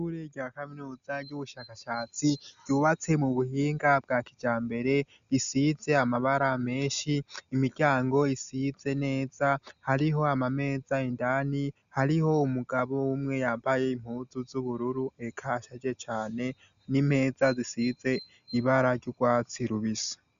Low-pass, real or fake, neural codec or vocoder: 7.2 kHz; real; none